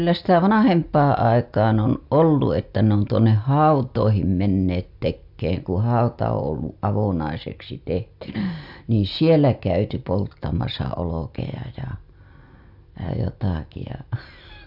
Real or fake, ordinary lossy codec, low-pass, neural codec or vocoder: real; AAC, 48 kbps; 5.4 kHz; none